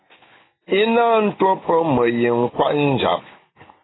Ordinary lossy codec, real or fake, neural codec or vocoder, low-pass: AAC, 16 kbps; real; none; 7.2 kHz